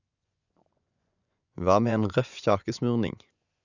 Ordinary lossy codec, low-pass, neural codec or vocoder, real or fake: none; 7.2 kHz; vocoder, 24 kHz, 100 mel bands, Vocos; fake